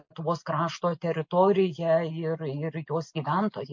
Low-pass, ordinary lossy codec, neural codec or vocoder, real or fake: 7.2 kHz; MP3, 48 kbps; none; real